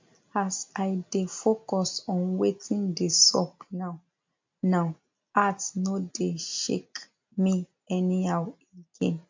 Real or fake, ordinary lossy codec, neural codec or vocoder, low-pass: real; MP3, 48 kbps; none; 7.2 kHz